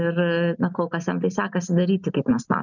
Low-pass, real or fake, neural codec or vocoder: 7.2 kHz; real; none